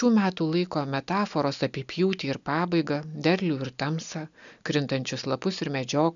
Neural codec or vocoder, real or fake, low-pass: none; real; 7.2 kHz